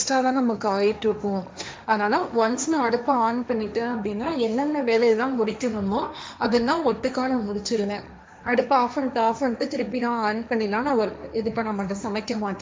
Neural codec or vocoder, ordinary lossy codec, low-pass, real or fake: codec, 16 kHz, 1.1 kbps, Voila-Tokenizer; none; none; fake